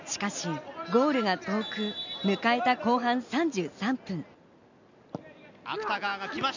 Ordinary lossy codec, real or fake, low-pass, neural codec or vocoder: none; real; 7.2 kHz; none